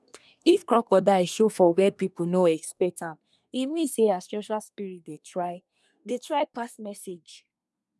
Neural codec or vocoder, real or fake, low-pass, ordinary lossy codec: codec, 24 kHz, 1 kbps, SNAC; fake; none; none